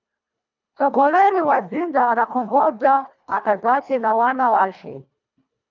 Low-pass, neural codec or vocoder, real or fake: 7.2 kHz; codec, 24 kHz, 1.5 kbps, HILCodec; fake